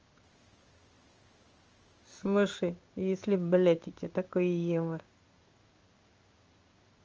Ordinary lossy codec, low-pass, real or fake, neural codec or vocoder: Opus, 24 kbps; 7.2 kHz; fake; codec, 16 kHz in and 24 kHz out, 1 kbps, XY-Tokenizer